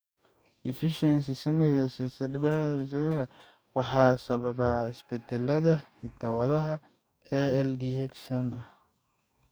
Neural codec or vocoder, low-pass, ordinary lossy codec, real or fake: codec, 44.1 kHz, 2.6 kbps, DAC; none; none; fake